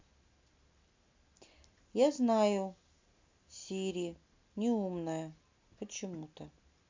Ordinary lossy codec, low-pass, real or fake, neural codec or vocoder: MP3, 64 kbps; 7.2 kHz; real; none